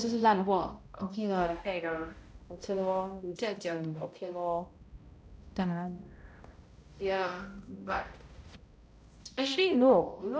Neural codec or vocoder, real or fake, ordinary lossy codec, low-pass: codec, 16 kHz, 0.5 kbps, X-Codec, HuBERT features, trained on balanced general audio; fake; none; none